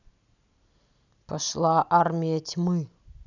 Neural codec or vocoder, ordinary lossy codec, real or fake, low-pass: none; none; real; 7.2 kHz